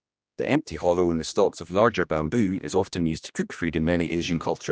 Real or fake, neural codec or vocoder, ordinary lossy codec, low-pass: fake; codec, 16 kHz, 1 kbps, X-Codec, HuBERT features, trained on general audio; none; none